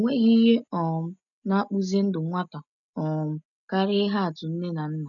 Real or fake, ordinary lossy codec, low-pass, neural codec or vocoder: real; none; 7.2 kHz; none